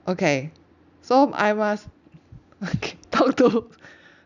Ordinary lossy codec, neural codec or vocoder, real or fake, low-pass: none; none; real; 7.2 kHz